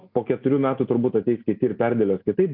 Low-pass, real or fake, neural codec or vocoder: 5.4 kHz; real; none